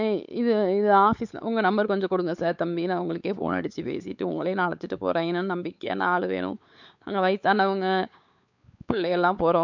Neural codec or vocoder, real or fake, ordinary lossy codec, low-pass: codec, 16 kHz, 4 kbps, X-Codec, WavLM features, trained on Multilingual LibriSpeech; fake; none; 7.2 kHz